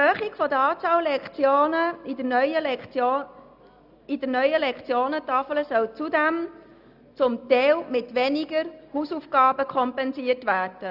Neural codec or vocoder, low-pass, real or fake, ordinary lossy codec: none; 5.4 kHz; real; none